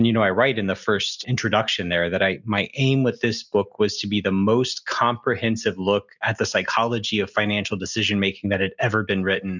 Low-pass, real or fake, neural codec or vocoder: 7.2 kHz; real; none